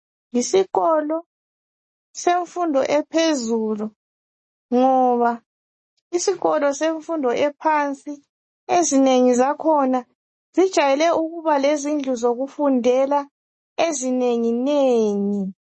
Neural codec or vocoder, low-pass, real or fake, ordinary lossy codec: none; 10.8 kHz; real; MP3, 32 kbps